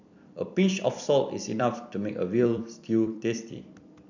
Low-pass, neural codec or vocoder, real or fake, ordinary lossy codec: 7.2 kHz; vocoder, 44.1 kHz, 128 mel bands every 256 samples, BigVGAN v2; fake; none